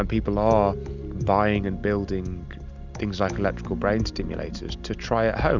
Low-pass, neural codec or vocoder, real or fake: 7.2 kHz; none; real